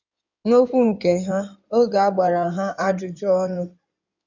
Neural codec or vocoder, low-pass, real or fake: codec, 16 kHz in and 24 kHz out, 2.2 kbps, FireRedTTS-2 codec; 7.2 kHz; fake